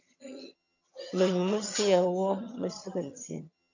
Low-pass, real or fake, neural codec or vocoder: 7.2 kHz; fake; vocoder, 22.05 kHz, 80 mel bands, HiFi-GAN